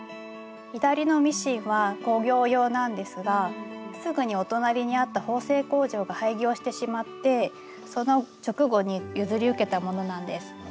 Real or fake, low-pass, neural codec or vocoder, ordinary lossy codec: real; none; none; none